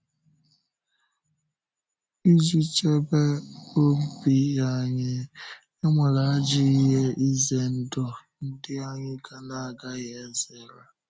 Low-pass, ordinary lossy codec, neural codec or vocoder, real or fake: none; none; none; real